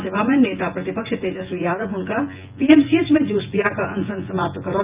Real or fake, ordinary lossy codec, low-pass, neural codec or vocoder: fake; Opus, 24 kbps; 3.6 kHz; vocoder, 24 kHz, 100 mel bands, Vocos